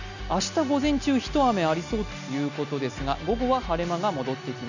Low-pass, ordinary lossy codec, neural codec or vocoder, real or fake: 7.2 kHz; none; none; real